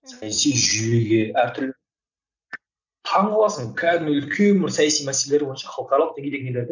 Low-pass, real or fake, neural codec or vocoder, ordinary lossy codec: 7.2 kHz; real; none; none